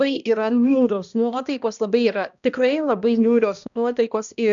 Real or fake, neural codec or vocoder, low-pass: fake; codec, 16 kHz, 1 kbps, X-Codec, HuBERT features, trained on balanced general audio; 7.2 kHz